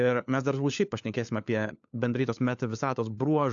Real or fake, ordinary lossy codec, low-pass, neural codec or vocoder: fake; AAC, 64 kbps; 7.2 kHz; codec, 16 kHz, 4 kbps, FunCodec, trained on LibriTTS, 50 frames a second